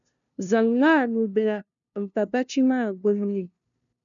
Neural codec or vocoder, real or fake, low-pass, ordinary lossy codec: codec, 16 kHz, 0.5 kbps, FunCodec, trained on LibriTTS, 25 frames a second; fake; 7.2 kHz; MP3, 96 kbps